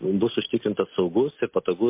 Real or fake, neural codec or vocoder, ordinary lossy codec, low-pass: real; none; MP3, 24 kbps; 3.6 kHz